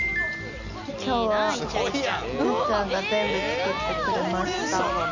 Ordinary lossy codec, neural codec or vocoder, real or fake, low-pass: none; none; real; 7.2 kHz